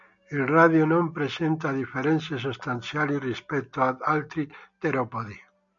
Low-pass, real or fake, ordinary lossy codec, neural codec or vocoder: 7.2 kHz; real; AAC, 64 kbps; none